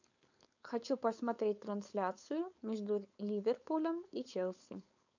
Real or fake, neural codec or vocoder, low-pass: fake; codec, 16 kHz, 4.8 kbps, FACodec; 7.2 kHz